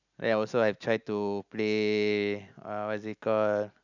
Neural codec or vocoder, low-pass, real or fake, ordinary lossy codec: none; 7.2 kHz; real; none